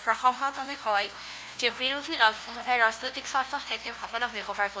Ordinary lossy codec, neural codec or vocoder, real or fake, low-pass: none; codec, 16 kHz, 0.5 kbps, FunCodec, trained on LibriTTS, 25 frames a second; fake; none